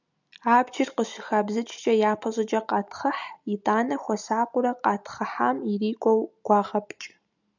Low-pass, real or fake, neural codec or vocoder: 7.2 kHz; real; none